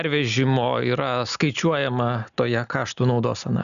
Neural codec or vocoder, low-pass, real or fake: none; 7.2 kHz; real